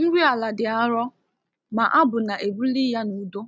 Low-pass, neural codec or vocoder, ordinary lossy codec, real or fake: none; none; none; real